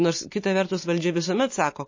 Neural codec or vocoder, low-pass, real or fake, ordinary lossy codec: none; 7.2 kHz; real; MP3, 32 kbps